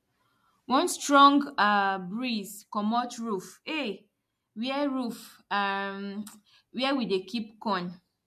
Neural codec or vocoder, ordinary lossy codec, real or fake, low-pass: none; MP3, 64 kbps; real; 14.4 kHz